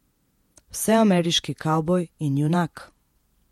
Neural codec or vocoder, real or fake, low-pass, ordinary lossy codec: vocoder, 48 kHz, 128 mel bands, Vocos; fake; 19.8 kHz; MP3, 64 kbps